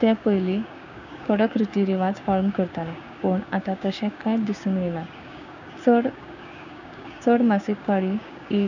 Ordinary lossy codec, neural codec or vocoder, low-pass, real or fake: none; codec, 16 kHz, 6 kbps, DAC; 7.2 kHz; fake